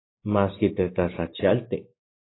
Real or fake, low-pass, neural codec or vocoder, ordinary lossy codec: real; 7.2 kHz; none; AAC, 16 kbps